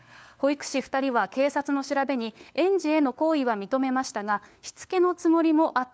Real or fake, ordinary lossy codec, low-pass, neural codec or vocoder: fake; none; none; codec, 16 kHz, 4 kbps, FunCodec, trained on LibriTTS, 50 frames a second